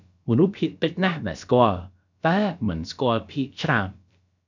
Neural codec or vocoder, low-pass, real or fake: codec, 16 kHz, about 1 kbps, DyCAST, with the encoder's durations; 7.2 kHz; fake